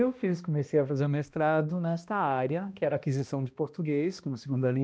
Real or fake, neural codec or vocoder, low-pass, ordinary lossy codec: fake; codec, 16 kHz, 1 kbps, X-Codec, HuBERT features, trained on balanced general audio; none; none